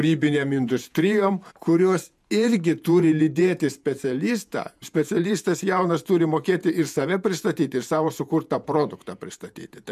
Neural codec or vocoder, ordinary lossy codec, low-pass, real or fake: vocoder, 48 kHz, 128 mel bands, Vocos; MP3, 96 kbps; 14.4 kHz; fake